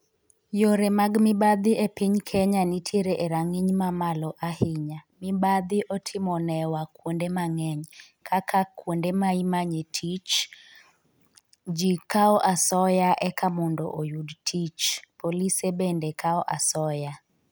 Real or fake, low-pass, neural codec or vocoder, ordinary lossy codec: real; none; none; none